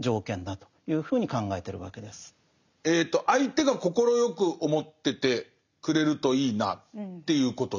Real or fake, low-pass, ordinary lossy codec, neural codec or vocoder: real; 7.2 kHz; none; none